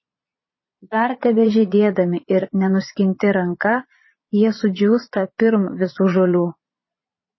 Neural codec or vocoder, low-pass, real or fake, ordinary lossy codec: vocoder, 22.05 kHz, 80 mel bands, Vocos; 7.2 kHz; fake; MP3, 24 kbps